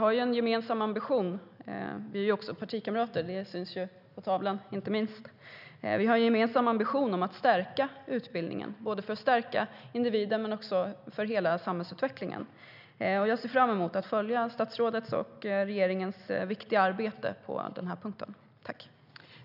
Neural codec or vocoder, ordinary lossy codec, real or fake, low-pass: none; none; real; 5.4 kHz